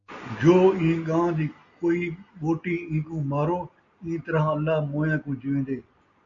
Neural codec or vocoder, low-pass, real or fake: none; 7.2 kHz; real